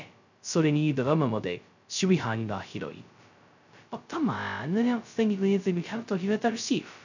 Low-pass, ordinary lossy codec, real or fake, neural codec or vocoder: 7.2 kHz; none; fake; codec, 16 kHz, 0.2 kbps, FocalCodec